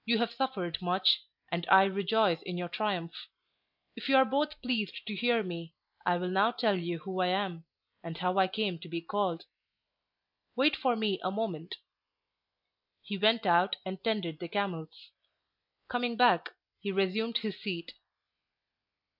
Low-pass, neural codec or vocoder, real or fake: 5.4 kHz; none; real